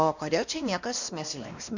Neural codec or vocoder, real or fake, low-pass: codec, 16 kHz, 1 kbps, X-Codec, WavLM features, trained on Multilingual LibriSpeech; fake; 7.2 kHz